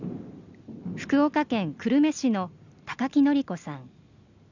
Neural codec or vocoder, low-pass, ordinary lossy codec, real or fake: none; 7.2 kHz; none; real